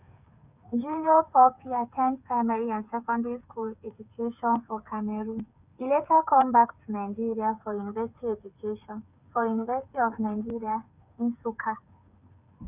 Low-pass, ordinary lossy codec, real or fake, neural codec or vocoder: 3.6 kHz; none; fake; codec, 16 kHz, 8 kbps, FreqCodec, smaller model